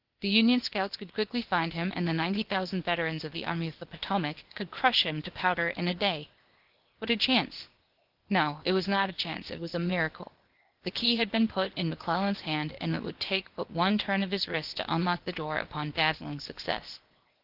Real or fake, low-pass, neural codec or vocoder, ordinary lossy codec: fake; 5.4 kHz; codec, 16 kHz, 0.8 kbps, ZipCodec; Opus, 16 kbps